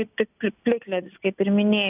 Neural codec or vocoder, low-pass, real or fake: none; 3.6 kHz; real